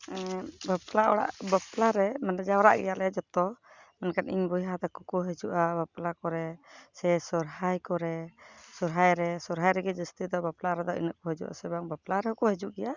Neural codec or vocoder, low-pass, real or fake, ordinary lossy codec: none; 7.2 kHz; real; Opus, 64 kbps